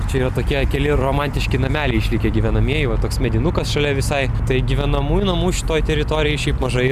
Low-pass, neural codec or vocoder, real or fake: 14.4 kHz; none; real